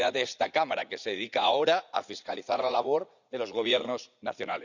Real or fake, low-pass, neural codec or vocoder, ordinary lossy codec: fake; 7.2 kHz; vocoder, 44.1 kHz, 80 mel bands, Vocos; none